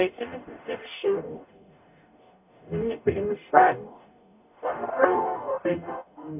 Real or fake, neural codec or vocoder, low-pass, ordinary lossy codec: fake; codec, 44.1 kHz, 0.9 kbps, DAC; 3.6 kHz; AAC, 32 kbps